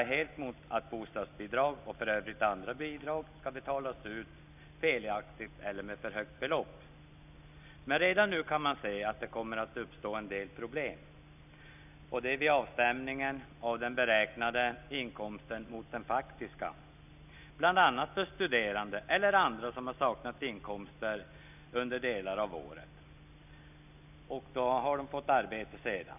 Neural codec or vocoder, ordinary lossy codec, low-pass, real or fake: none; none; 3.6 kHz; real